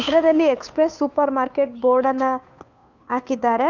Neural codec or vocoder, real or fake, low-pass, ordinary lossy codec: codec, 16 kHz, 2 kbps, FunCodec, trained on Chinese and English, 25 frames a second; fake; 7.2 kHz; none